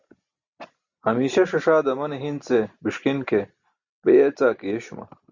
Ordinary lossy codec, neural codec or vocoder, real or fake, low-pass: Opus, 64 kbps; none; real; 7.2 kHz